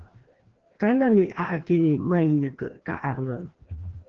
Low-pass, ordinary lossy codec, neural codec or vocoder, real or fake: 7.2 kHz; Opus, 16 kbps; codec, 16 kHz, 1 kbps, FreqCodec, larger model; fake